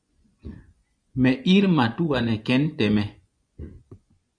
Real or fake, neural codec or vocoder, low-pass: fake; vocoder, 24 kHz, 100 mel bands, Vocos; 9.9 kHz